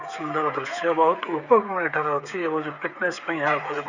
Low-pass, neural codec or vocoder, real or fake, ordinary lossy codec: 7.2 kHz; codec, 16 kHz, 16 kbps, FreqCodec, smaller model; fake; Opus, 64 kbps